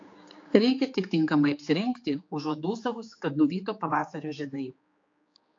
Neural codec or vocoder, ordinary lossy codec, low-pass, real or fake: codec, 16 kHz, 4 kbps, X-Codec, HuBERT features, trained on general audio; AAC, 48 kbps; 7.2 kHz; fake